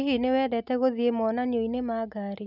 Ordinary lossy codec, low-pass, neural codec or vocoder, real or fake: none; 5.4 kHz; none; real